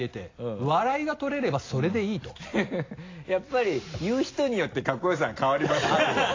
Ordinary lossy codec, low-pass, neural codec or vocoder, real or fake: AAC, 32 kbps; 7.2 kHz; none; real